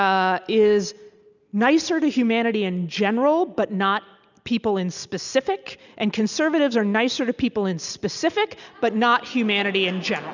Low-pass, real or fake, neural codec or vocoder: 7.2 kHz; real; none